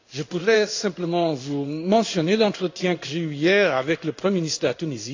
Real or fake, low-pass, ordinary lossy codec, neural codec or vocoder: fake; 7.2 kHz; AAC, 48 kbps; codec, 16 kHz in and 24 kHz out, 1 kbps, XY-Tokenizer